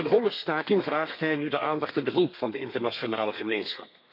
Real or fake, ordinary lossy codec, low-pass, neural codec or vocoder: fake; MP3, 48 kbps; 5.4 kHz; codec, 32 kHz, 1.9 kbps, SNAC